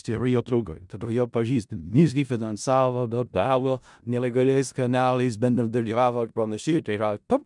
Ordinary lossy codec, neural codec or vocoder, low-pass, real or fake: MP3, 96 kbps; codec, 16 kHz in and 24 kHz out, 0.4 kbps, LongCat-Audio-Codec, four codebook decoder; 10.8 kHz; fake